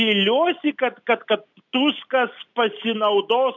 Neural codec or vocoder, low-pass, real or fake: none; 7.2 kHz; real